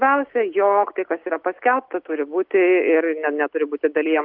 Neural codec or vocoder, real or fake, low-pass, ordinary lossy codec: none; real; 5.4 kHz; Opus, 32 kbps